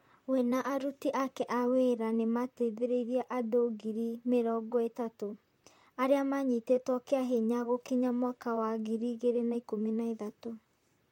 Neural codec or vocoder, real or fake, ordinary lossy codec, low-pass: vocoder, 44.1 kHz, 128 mel bands, Pupu-Vocoder; fake; MP3, 64 kbps; 19.8 kHz